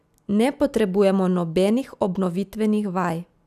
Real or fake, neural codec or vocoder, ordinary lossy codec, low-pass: real; none; none; 14.4 kHz